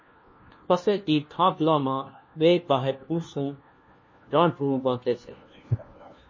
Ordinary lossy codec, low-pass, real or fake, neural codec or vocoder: MP3, 32 kbps; 7.2 kHz; fake; codec, 16 kHz, 1 kbps, FunCodec, trained on LibriTTS, 50 frames a second